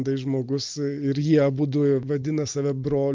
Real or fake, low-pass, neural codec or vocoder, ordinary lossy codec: real; 7.2 kHz; none; Opus, 32 kbps